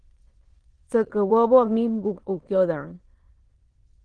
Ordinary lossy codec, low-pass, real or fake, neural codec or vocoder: Opus, 16 kbps; 9.9 kHz; fake; autoencoder, 22.05 kHz, a latent of 192 numbers a frame, VITS, trained on many speakers